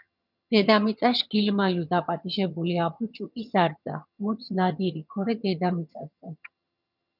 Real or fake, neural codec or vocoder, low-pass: fake; vocoder, 22.05 kHz, 80 mel bands, HiFi-GAN; 5.4 kHz